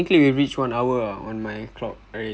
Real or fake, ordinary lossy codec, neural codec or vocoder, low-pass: real; none; none; none